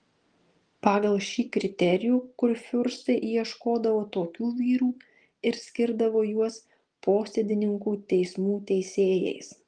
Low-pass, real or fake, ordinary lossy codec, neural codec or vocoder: 9.9 kHz; real; Opus, 24 kbps; none